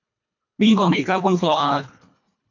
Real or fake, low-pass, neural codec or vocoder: fake; 7.2 kHz; codec, 24 kHz, 1.5 kbps, HILCodec